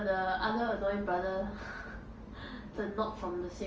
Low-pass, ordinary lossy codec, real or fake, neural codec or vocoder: 7.2 kHz; Opus, 24 kbps; real; none